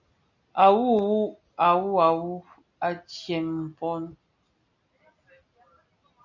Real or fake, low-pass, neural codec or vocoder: real; 7.2 kHz; none